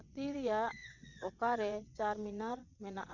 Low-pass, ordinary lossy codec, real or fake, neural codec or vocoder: 7.2 kHz; none; fake; vocoder, 22.05 kHz, 80 mel bands, WaveNeXt